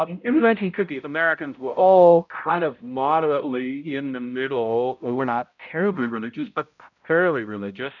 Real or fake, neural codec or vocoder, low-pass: fake; codec, 16 kHz, 0.5 kbps, X-Codec, HuBERT features, trained on balanced general audio; 7.2 kHz